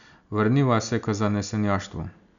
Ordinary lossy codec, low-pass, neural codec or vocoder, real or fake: none; 7.2 kHz; none; real